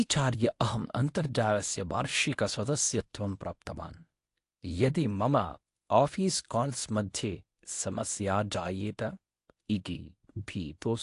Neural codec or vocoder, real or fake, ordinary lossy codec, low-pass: codec, 24 kHz, 0.9 kbps, WavTokenizer, medium speech release version 1; fake; AAC, 48 kbps; 10.8 kHz